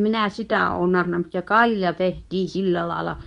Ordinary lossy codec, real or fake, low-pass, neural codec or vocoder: none; fake; 10.8 kHz; codec, 24 kHz, 0.9 kbps, WavTokenizer, medium speech release version 2